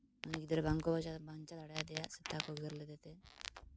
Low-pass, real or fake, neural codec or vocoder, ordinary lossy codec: none; real; none; none